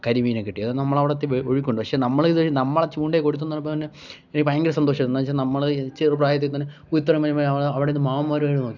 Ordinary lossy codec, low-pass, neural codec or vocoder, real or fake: none; 7.2 kHz; none; real